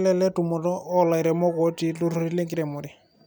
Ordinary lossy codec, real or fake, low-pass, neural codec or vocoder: none; real; none; none